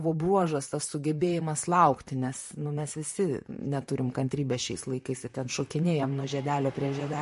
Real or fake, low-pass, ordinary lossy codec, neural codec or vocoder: fake; 14.4 kHz; MP3, 48 kbps; vocoder, 44.1 kHz, 128 mel bands, Pupu-Vocoder